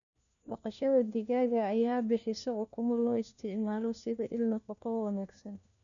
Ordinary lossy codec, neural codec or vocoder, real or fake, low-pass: none; codec, 16 kHz, 1 kbps, FunCodec, trained on LibriTTS, 50 frames a second; fake; 7.2 kHz